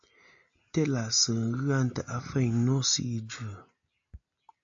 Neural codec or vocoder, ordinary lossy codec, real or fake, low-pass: none; AAC, 64 kbps; real; 7.2 kHz